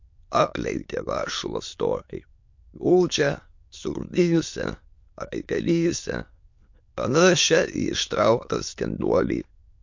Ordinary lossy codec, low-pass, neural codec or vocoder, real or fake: MP3, 48 kbps; 7.2 kHz; autoencoder, 22.05 kHz, a latent of 192 numbers a frame, VITS, trained on many speakers; fake